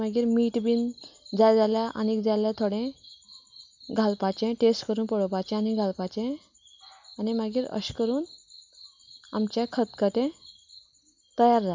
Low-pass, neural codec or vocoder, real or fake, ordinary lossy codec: 7.2 kHz; none; real; MP3, 64 kbps